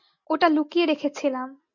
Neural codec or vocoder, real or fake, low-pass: none; real; 7.2 kHz